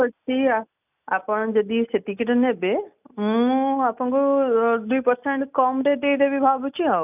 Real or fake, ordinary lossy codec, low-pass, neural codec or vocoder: real; none; 3.6 kHz; none